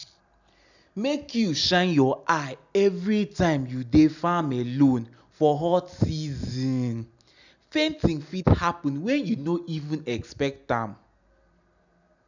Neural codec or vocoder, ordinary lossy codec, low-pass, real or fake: none; none; 7.2 kHz; real